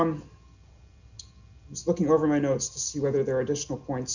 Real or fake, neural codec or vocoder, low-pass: real; none; 7.2 kHz